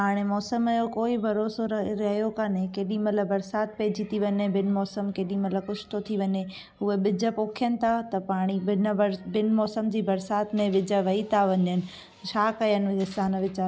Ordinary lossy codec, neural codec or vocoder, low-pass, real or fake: none; none; none; real